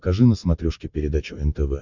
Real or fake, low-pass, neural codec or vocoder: real; 7.2 kHz; none